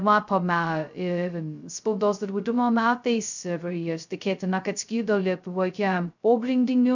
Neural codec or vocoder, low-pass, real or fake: codec, 16 kHz, 0.2 kbps, FocalCodec; 7.2 kHz; fake